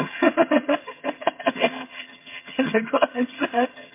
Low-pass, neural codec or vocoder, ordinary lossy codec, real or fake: 3.6 kHz; vocoder, 22.05 kHz, 80 mel bands, HiFi-GAN; MP3, 24 kbps; fake